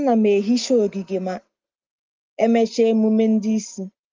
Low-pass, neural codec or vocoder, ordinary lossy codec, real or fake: 7.2 kHz; none; Opus, 32 kbps; real